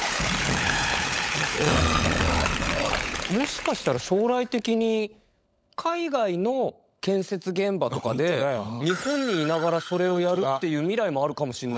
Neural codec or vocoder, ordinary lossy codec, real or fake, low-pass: codec, 16 kHz, 16 kbps, FunCodec, trained on LibriTTS, 50 frames a second; none; fake; none